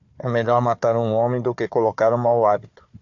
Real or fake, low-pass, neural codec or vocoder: fake; 7.2 kHz; codec, 16 kHz, 4 kbps, FunCodec, trained on Chinese and English, 50 frames a second